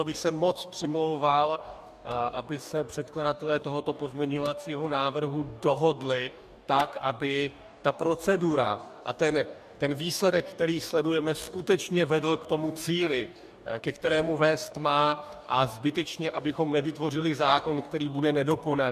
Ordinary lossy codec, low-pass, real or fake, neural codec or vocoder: MP3, 96 kbps; 14.4 kHz; fake; codec, 44.1 kHz, 2.6 kbps, DAC